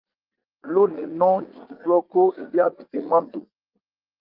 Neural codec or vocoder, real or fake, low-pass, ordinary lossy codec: vocoder, 44.1 kHz, 80 mel bands, Vocos; fake; 5.4 kHz; Opus, 24 kbps